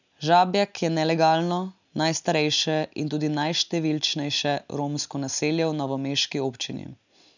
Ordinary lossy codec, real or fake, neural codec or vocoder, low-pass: none; real; none; 7.2 kHz